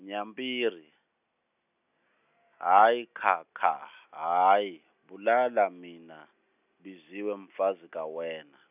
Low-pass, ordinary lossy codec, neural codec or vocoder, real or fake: 3.6 kHz; none; none; real